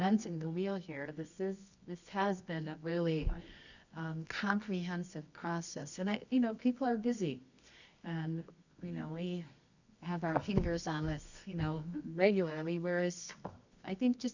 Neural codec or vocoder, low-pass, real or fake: codec, 24 kHz, 0.9 kbps, WavTokenizer, medium music audio release; 7.2 kHz; fake